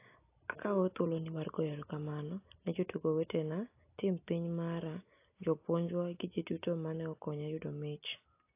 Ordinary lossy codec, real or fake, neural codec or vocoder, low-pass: AAC, 24 kbps; real; none; 3.6 kHz